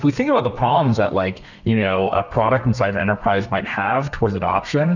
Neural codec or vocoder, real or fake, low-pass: codec, 32 kHz, 1.9 kbps, SNAC; fake; 7.2 kHz